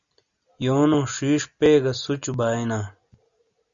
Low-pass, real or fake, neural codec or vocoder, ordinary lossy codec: 7.2 kHz; real; none; Opus, 64 kbps